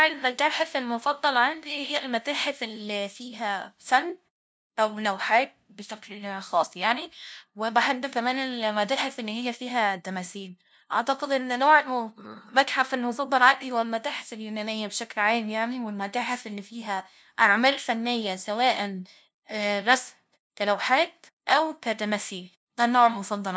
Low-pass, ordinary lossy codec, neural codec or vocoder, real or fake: none; none; codec, 16 kHz, 0.5 kbps, FunCodec, trained on LibriTTS, 25 frames a second; fake